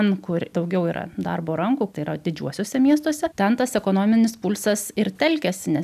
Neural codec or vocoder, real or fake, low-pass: none; real; 14.4 kHz